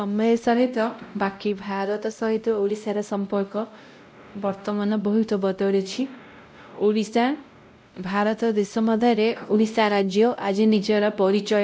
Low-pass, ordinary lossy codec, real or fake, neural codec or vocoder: none; none; fake; codec, 16 kHz, 0.5 kbps, X-Codec, WavLM features, trained on Multilingual LibriSpeech